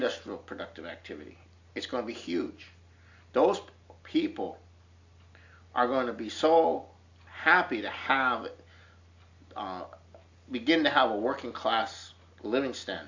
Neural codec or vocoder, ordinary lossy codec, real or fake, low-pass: none; AAC, 48 kbps; real; 7.2 kHz